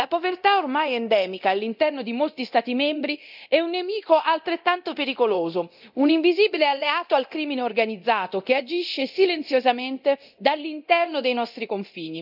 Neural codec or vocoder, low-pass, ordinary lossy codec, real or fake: codec, 24 kHz, 0.9 kbps, DualCodec; 5.4 kHz; none; fake